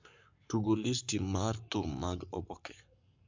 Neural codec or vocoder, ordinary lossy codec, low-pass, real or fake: codec, 44.1 kHz, 7.8 kbps, Pupu-Codec; MP3, 64 kbps; 7.2 kHz; fake